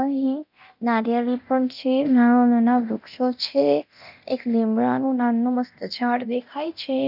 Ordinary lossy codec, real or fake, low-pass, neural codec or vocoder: none; fake; 5.4 kHz; codec, 24 kHz, 0.9 kbps, DualCodec